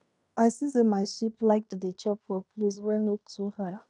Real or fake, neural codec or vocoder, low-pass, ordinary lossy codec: fake; codec, 16 kHz in and 24 kHz out, 0.9 kbps, LongCat-Audio-Codec, fine tuned four codebook decoder; 10.8 kHz; none